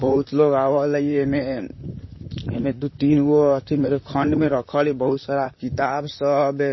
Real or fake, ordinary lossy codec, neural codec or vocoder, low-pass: fake; MP3, 24 kbps; vocoder, 44.1 kHz, 128 mel bands, Pupu-Vocoder; 7.2 kHz